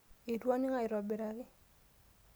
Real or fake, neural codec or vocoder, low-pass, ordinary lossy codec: real; none; none; none